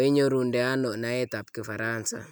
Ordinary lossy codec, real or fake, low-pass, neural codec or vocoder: none; real; none; none